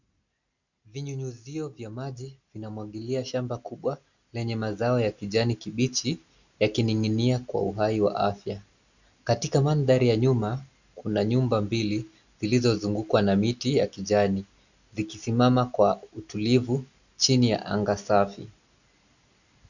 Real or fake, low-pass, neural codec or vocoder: real; 7.2 kHz; none